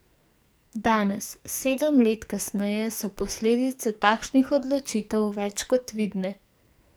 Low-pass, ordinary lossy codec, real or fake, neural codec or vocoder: none; none; fake; codec, 44.1 kHz, 2.6 kbps, SNAC